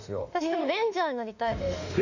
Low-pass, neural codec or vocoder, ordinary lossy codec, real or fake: 7.2 kHz; autoencoder, 48 kHz, 32 numbers a frame, DAC-VAE, trained on Japanese speech; none; fake